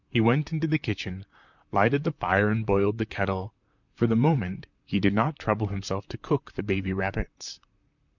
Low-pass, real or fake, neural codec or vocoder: 7.2 kHz; fake; vocoder, 44.1 kHz, 128 mel bands, Pupu-Vocoder